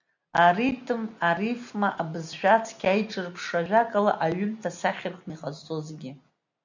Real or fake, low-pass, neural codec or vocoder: real; 7.2 kHz; none